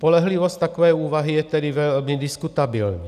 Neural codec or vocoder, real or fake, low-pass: none; real; 14.4 kHz